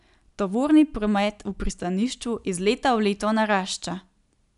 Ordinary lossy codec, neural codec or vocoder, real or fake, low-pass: none; none; real; 10.8 kHz